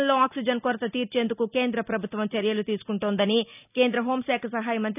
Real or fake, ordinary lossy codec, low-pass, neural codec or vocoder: real; none; 3.6 kHz; none